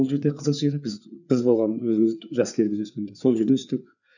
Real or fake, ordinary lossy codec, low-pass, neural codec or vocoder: fake; none; 7.2 kHz; codec, 16 kHz, 4 kbps, FreqCodec, larger model